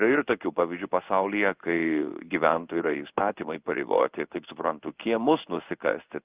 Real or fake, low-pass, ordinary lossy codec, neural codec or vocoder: fake; 3.6 kHz; Opus, 24 kbps; codec, 16 kHz in and 24 kHz out, 1 kbps, XY-Tokenizer